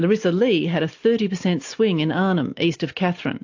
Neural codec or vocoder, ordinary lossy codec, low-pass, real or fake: none; AAC, 48 kbps; 7.2 kHz; real